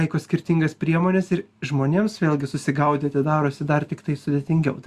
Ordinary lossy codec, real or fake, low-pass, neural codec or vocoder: Opus, 64 kbps; real; 14.4 kHz; none